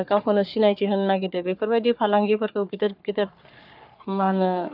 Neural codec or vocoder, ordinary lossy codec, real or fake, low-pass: codec, 44.1 kHz, 3.4 kbps, Pupu-Codec; none; fake; 5.4 kHz